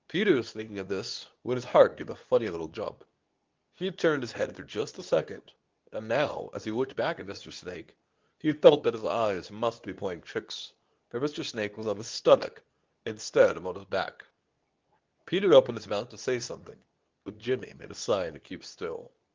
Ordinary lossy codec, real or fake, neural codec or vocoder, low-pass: Opus, 24 kbps; fake; codec, 24 kHz, 0.9 kbps, WavTokenizer, medium speech release version 2; 7.2 kHz